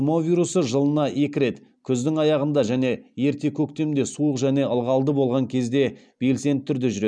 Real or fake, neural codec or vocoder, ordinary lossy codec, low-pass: real; none; none; 9.9 kHz